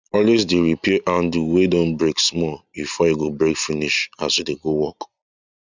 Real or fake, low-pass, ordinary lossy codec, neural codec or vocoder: real; 7.2 kHz; none; none